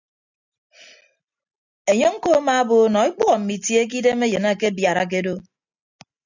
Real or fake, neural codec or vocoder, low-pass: real; none; 7.2 kHz